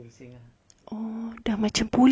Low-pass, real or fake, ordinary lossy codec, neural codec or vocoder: none; real; none; none